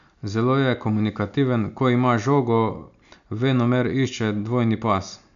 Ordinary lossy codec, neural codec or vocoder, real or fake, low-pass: none; none; real; 7.2 kHz